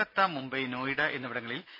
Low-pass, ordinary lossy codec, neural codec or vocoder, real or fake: 5.4 kHz; MP3, 24 kbps; none; real